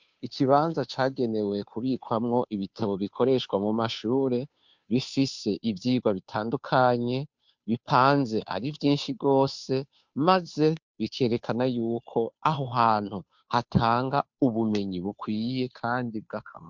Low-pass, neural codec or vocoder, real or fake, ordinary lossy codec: 7.2 kHz; codec, 16 kHz, 2 kbps, FunCodec, trained on Chinese and English, 25 frames a second; fake; MP3, 64 kbps